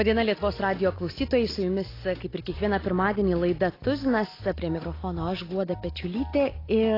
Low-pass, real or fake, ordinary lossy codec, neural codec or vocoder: 5.4 kHz; real; AAC, 24 kbps; none